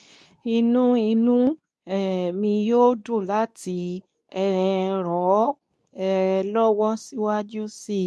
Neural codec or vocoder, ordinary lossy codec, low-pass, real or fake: codec, 24 kHz, 0.9 kbps, WavTokenizer, medium speech release version 2; none; none; fake